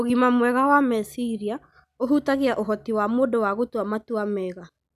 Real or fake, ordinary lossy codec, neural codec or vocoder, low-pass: real; none; none; 14.4 kHz